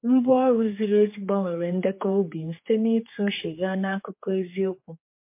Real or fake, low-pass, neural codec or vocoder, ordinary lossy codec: fake; 3.6 kHz; codec, 16 kHz, 4 kbps, X-Codec, HuBERT features, trained on general audio; MP3, 24 kbps